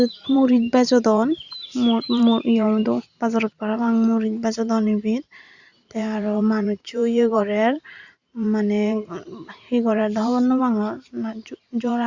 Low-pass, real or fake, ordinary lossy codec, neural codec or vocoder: 7.2 kHz; fake; Opus, 64 kbps; vocoder, 44.1 kHz, 128 mel bands every 512 samples, BigVGAN v2